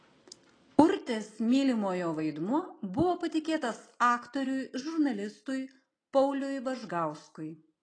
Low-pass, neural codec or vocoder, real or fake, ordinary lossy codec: 9.9 kHz; none; real; AAC, 32 kbps